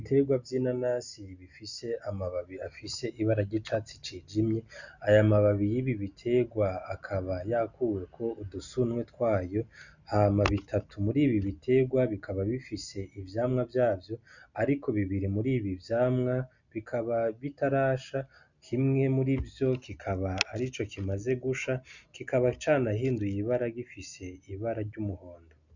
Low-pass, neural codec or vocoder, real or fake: 7.2 kHz; none; real